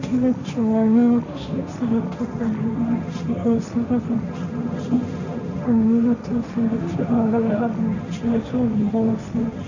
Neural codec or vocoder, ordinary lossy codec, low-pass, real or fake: codec, 16 kHz, 1.1 kbps, Voila-Tokenizer; none; 7.2 kHz; fake